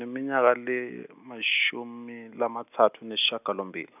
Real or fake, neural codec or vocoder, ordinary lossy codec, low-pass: real; none; none; 3.6 kHz